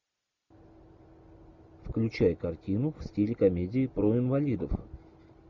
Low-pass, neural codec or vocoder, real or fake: 7.2 kHz; none; real